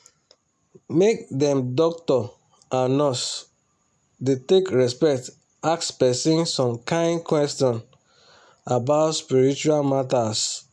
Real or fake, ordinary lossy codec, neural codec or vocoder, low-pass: real; none; none; none